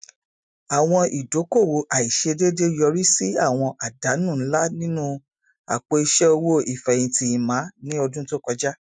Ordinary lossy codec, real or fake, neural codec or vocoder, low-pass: none; real; none; 9.9 kHz